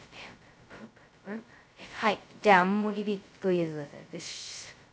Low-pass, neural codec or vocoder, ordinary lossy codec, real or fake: none; codec, 16 kHz, 0.2 kbps, FocalCodec; none; fake